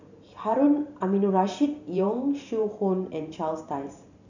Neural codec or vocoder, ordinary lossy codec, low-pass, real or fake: vocoder, 44.1 kHz, 128 mel bands every 512 samples, BigVGAN v2; none; 7.2 kHz; fake